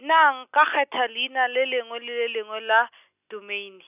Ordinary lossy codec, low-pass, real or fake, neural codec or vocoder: none; 3.6 kHz; real; none